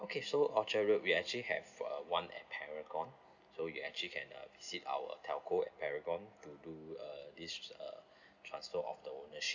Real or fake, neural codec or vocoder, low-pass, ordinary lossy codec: real; none; 7.2 kHz; none